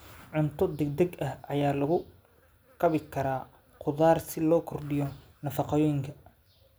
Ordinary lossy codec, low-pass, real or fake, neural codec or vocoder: none; none; real; none